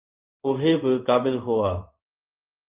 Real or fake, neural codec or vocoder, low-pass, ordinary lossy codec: fake; codec, 16 kHz in and 24 kHz out, 1 kbps, XY-Tokenizer; 3.6 kHz; Opus, 32 kbps